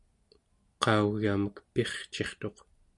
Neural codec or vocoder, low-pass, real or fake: none; 10.8 kHz; real